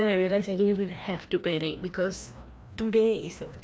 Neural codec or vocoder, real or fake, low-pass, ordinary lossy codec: codec, 16 kHz, 1 kbps, FreqCodec, larger model; fake; none; none